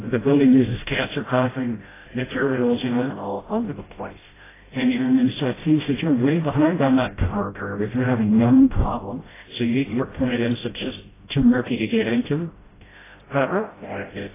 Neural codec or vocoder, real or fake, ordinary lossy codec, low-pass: codec, 16 kHz, 0.5 kbps, FreqCodec, smaller model; fake; AAC, 16 kbps; 3.6 kHz